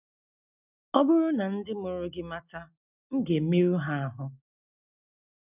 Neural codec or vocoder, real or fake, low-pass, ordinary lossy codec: none; real; 3.6 kHz; none